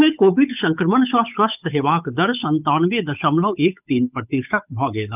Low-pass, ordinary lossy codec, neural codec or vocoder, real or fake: 3.6 kHz; none; codec, 16 kHz, 8 kbps, FunCodec, trained on Chinese and English, 25 frames a second; fake